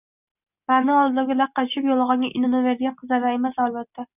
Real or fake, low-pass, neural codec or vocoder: real; 3.6 kHz; none